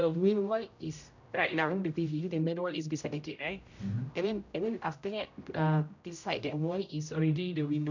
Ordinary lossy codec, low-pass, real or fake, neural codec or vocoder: none; 7.2 kHz; fake; codec, 16 kHz, 0.5 kbps, X-Codec, HuBERT features, trained on general audio